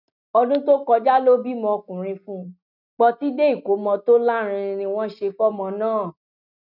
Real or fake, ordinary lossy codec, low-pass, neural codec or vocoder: real; none; 5.4 kHz; none